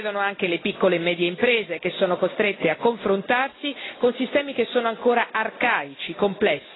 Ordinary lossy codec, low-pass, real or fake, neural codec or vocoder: AAC, 16 kbps; 7.2 kHz; real; none